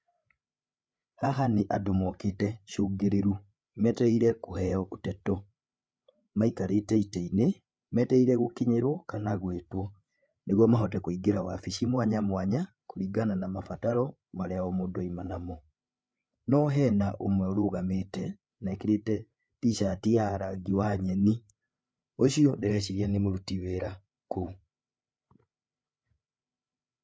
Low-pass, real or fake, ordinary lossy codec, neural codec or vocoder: none; fake; none; codec, 16 kHz, 8 kbps, FreqCodec, larger model